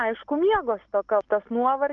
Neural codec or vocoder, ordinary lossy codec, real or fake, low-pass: none; Opus, 24 kbps; real; 7.2 kHz